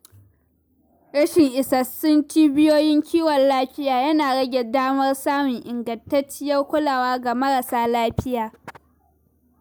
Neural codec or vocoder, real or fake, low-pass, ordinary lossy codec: none; real; none; none